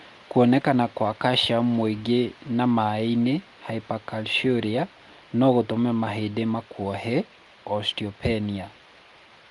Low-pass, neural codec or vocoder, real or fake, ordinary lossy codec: 10.8 kHz; none; real; Opus, 32 kbps